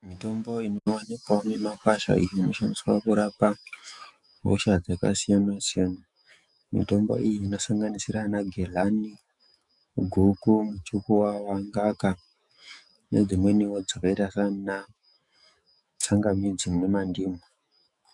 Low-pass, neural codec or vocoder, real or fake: 10.8 kHz; autoencoder, 48 kHz, 128 numbers a frame, DAC-VAE, trained on Japanese speech; fake